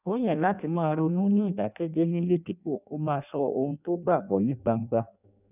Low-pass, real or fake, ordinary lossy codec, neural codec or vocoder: 3.6 kHz; fake; none; codec, 16 kHz in and 24 kHz out, 0.6 kbps, FireRedTTS-2 codec